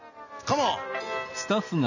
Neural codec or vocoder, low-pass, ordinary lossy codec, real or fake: none; 7.2 kHz; none; real